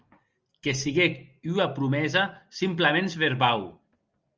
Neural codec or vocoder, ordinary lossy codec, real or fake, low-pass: none; Opus, 24 kbps; real; 7.2 kHz